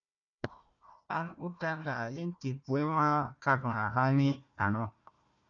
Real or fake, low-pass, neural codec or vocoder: fake; 7.2 kHz; codec, 16 kHz, 1 kbps, FunCodec, trained on Chinese and English, 50 frames a second